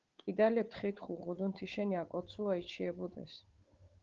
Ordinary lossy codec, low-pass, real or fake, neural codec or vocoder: Opus, 16 kbps; 7.2 kHz; fake; codec, 16 kHz, 8 kbps, FunCodec, trained on Chinese and English, 25 frames a second